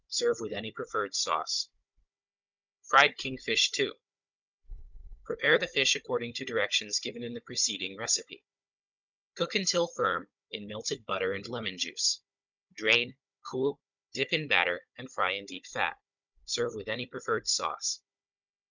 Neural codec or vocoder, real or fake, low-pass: codec, 16 kHz, 16 kbps, FunCodec, trained on Chinese and English, 50 frames a second; fake; 7.2 kHz